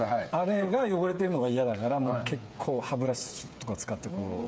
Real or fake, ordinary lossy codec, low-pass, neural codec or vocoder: fake; none; none; codec, 16 kHz, 8 kbps, FreqCodec, smaller model